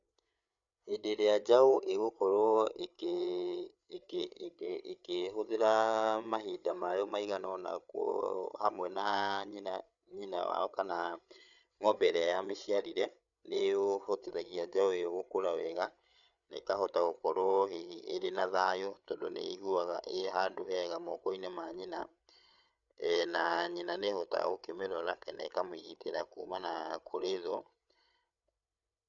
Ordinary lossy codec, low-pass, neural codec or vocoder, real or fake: none; 7.2 kHz; codec, 16 kHz, 8 kbps, FreqCodec, larger model; fake